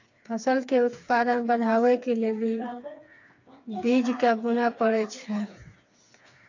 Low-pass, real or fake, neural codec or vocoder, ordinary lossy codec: 7.2 kHz; fake; codec, 16 kHz, 4 kbps, FreqCodec, smaller model; AAC, 48 kbps